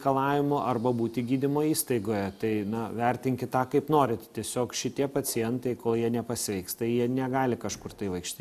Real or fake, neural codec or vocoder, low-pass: real; none; 14.4 kHz